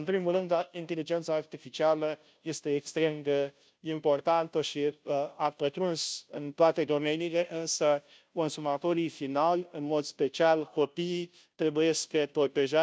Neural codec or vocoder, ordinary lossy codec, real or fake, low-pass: codec, 16 kHz, 0.5 kbps, FunCodec, trained on Chinese and English, 25 frames a second; none; fake; none